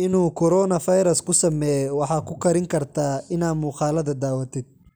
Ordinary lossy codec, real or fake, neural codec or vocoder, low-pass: none; real; none; none